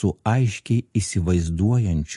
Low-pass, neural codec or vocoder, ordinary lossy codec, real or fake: 14.4 kHz; none; MP3, 48 kbps; real